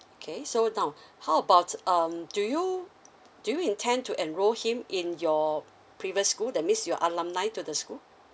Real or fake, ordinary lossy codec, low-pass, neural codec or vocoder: real; none; none; none